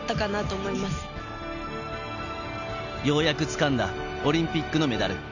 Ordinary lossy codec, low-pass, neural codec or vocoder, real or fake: none; 7.2 kHz; none; real